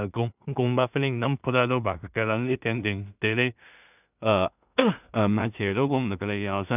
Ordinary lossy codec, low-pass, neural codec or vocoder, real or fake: none; 3.6 kHz; codec, 16 kHz in and 24 kHz out, 0.4 kbps, LongCat-Audio-Codec, two codebook decoder; fake